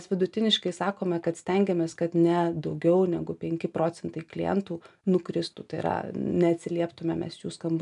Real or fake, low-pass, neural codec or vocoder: real; 10.8 kHz; none